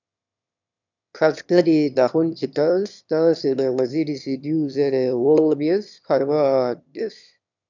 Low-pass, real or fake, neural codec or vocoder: 7.2 kHz; fake; autoencoder, 22.05 kHz, a latent of 192 numbers a frame, VITS, trained on one speaker